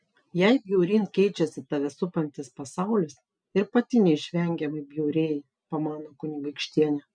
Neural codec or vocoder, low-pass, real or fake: none; 9.9 kHz; real